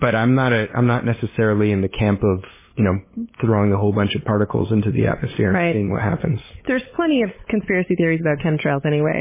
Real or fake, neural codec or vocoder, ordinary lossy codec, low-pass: fake; codec, 16 kHz, 8 kbps, FunCodec, trained on LibriTTS, 25 frames a second; MP3, 16 kbps; 3.6 kHz